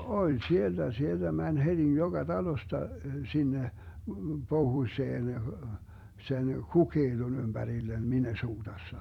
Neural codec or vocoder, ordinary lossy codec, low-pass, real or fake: vocoder, 44.1 kHz, 128 mel bands every 256 samples, BigVGAN v2; none; 19.8 kHz; fake